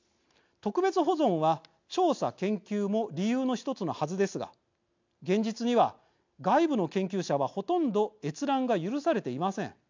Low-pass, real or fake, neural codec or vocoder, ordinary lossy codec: 7.2 kHz; real; none; MP3, 64 kbps